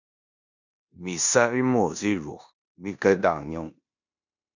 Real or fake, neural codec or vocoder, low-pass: fake; codec, 16 kHz in and 24 kHz out, 0.9 kbps, LongCat-Audio-Codec, four codebook decoder; 7.2 kHz